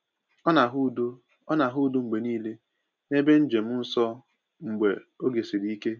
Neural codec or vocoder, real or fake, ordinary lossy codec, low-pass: none; real; none; 7.2 kHz